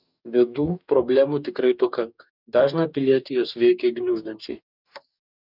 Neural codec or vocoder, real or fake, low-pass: codec, 44.1 kHz, 2.6 kbps, DAC; fake; 5.4 kHz